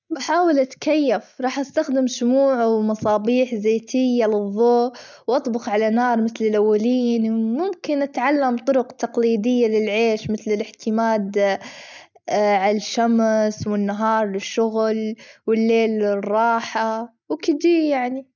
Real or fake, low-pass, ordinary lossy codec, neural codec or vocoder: real; 7.2 kHz; none; none